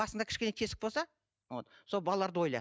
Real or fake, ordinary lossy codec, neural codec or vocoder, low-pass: real; none; none; none